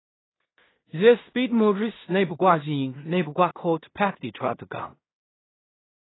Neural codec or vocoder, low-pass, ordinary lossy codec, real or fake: codec, 16 kHz in and 24 kHz out, 0.4 kbps, LongCat-Audio-Codec, two codebook decoder; 7.2 kHz; AAC, 16 kbps; fake